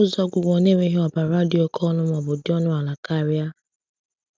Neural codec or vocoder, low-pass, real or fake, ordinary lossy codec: none; none; real; none